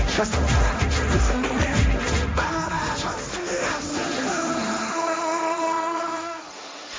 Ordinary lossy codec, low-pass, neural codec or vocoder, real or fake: none; none; codec, 16 kHz, 1.1 kbps, Voila-Tokenizer; fake